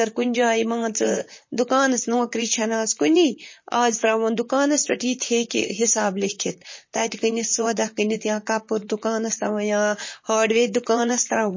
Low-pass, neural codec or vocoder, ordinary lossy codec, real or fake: 7.2 kHz; vocoder, 44.1 kHz, 128 mel bands, Pupu-Vocoder; MP3, 32 kbps; fake